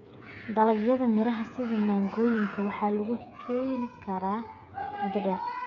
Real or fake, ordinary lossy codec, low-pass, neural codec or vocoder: fake; none; 7.2 kHz; codec, 16 kHz, 8 kbps, FreqCodec, smaller model